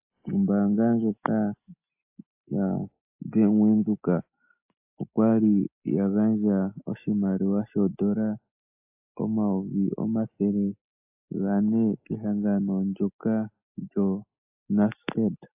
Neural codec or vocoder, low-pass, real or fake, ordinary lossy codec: none; 3.6 kHz; real; AAC, 32 kbps